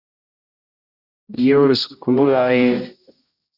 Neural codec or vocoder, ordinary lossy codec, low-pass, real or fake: codec, 16 kHz, 0.5 kbps, X-Codec, HuBERT features, trained on general audio; Opus, 64 kbps; 5.4 kHz; fake